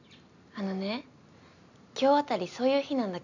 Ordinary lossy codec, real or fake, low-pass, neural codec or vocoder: none; real; 7.2 kHz; none